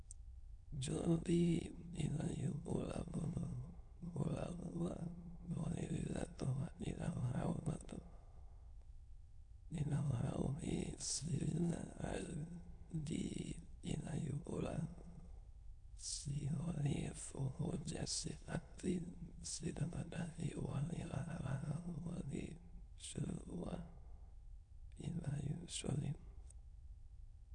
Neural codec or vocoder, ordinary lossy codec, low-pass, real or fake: autoencoder, 22.05 kHz, a latent of 192 numbers a frame, VITS, trained on many speakers; none; 9.9 kHz; fake